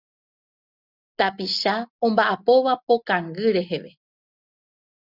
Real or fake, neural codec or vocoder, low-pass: real; none; 5.4 kHz